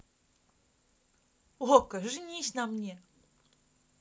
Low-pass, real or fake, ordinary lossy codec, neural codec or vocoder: none; real; none; none